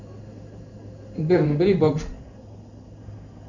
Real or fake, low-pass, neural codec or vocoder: fake; 7.2 kHz; codec, 16 kHz in and 24 kHz out, 1 kbps, XY-Tokenizer